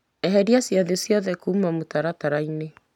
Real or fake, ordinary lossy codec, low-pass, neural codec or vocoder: real; none; 19.8 kHz; none